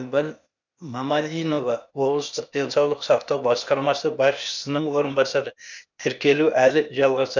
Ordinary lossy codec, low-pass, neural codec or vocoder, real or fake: none; 7.2 kHz; codec, 16 kHz, 0.8 kbps, ZipCodec; fake